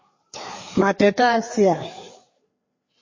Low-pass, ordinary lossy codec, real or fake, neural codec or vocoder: 7.2 kHz; MP3, 32 kbps; fake; codec, 16 kHz, 4 kbps, FreqCodec, larger model